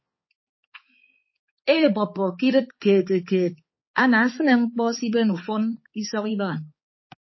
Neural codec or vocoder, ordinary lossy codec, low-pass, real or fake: codec, 16 kHz, 4 kbps, X-Codec, HuBERT features, trained on balanced general audio; MP3, 24 kbps; 7.2 kHz; fake